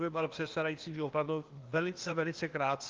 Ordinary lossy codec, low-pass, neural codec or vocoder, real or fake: Opus, 32 kbps; 7.2 kHz; codec, 16 kHz, 0.8 kbps, ZipCodec; fake